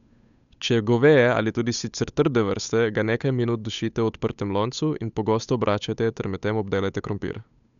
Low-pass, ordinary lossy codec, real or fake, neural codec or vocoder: 7.2 kHz; none; fake; codec, 16 kHz, 8 kbps, FunCodec, trained on Chinese and English, 25 frames a second